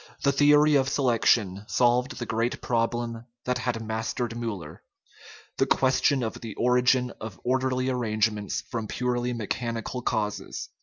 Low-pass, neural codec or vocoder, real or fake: 7.2 kHz; none; real